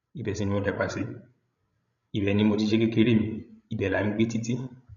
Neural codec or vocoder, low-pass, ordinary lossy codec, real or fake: codec, 16 kHz, 16 kbps, FreqCodec, larger model; 7.2 kHz; none; fake